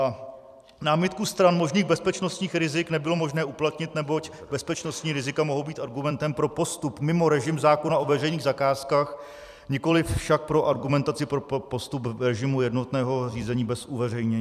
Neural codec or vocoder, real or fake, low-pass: vocoder, 44.1 kHz, 128 mel bands every 256 samples, BigVGAN v2; fake; 14.4 kHz